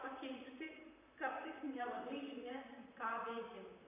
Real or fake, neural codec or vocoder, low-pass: fake; vocoder, 44.1 kHz, 128 mel bands, Pupu-Vocoder; 3.6 kHz